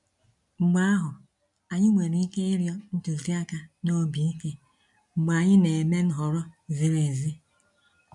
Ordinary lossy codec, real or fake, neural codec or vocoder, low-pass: none; real; none; 10.8 kHz